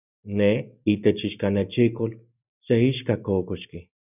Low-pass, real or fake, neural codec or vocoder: 3.6 kHz; real; none